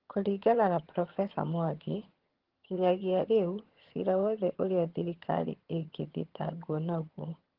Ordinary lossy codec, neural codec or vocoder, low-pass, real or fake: Opus, 16 kbps; vocoder, 22.05 kHz, 80 mel bands, HiFi-GAN; 5.4 kHz; fake